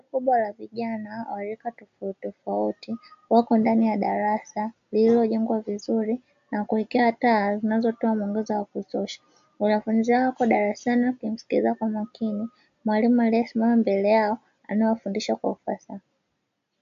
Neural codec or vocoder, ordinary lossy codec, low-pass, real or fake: none; AAC, 64 kbps; 7.2 kHz; real